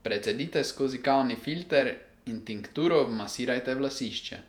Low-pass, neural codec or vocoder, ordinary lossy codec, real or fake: 19.8 kHz; vocoder, 48 kHz, 128 mel bands, Vocos; none; fake